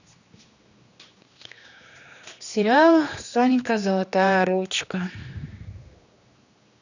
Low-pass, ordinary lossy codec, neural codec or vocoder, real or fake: 7.2 kHz; none; codec, 16 kHz, 2 kbps, X-Codec, HuBERT features, trained on general audio; fake